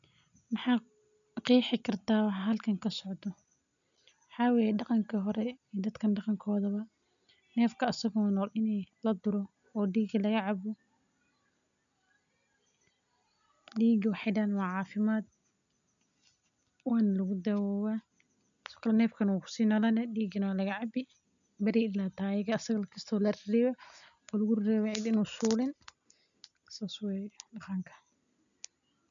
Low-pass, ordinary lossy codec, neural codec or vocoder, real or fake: 7.2 kHz; none; none; real